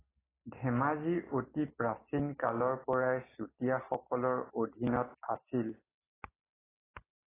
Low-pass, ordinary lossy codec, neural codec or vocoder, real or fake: 3.6 kHz; AAC, 16 kbps; none; real